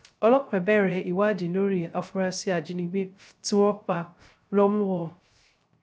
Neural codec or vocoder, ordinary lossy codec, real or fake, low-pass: codec, 16 kHz, 0.3 kbps, FocalCodec; none; fake; none